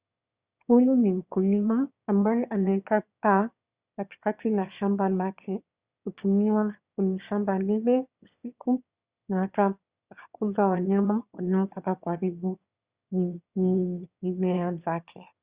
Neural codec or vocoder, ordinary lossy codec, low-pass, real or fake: autoencoder, 22.05 kHz, a latent of 192 numbers a frame, VITS, trained on one speaker; Opus, 64 kbps; 3.6 kHz; fake